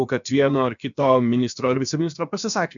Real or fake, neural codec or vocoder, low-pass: fake; codec, 16 kHz, about 1 kbps, DyCAST, with the encoder's durations; 7.2 kHz